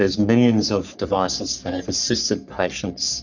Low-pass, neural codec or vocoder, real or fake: 7.2 kHz; codec, 44.1 kHz, 3.4 kbps, Pupu-Codec; fake